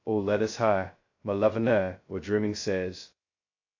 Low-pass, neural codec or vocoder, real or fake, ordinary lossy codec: 7.2 kHz; codec, 16 kHz, 0.2 kbps, FocalCodec; fake; AAC, 48 kbps